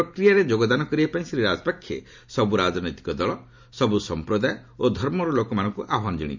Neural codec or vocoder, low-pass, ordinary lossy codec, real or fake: none; 7.2 kHz; MP3, 64 kbps; real